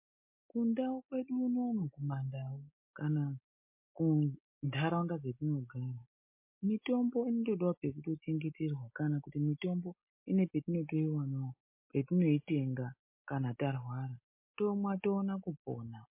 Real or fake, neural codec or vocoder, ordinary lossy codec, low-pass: real; none; MP3, 24 kbps; 3.6 kHz